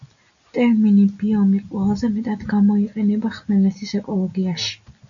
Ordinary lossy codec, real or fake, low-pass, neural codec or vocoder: MP3, 96 kbps; real; 7.2 kHz; none